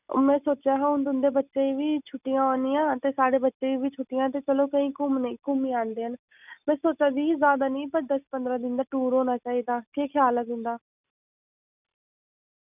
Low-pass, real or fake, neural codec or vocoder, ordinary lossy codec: 3.6 kHz; real; none; none